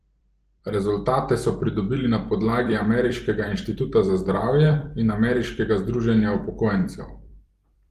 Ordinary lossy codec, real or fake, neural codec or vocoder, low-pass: Opus, 24 kbps; fake; vocoder, 44.1 kHz, 128 mel bands every 256 samples, BigVGAN v2; 14.4 kHz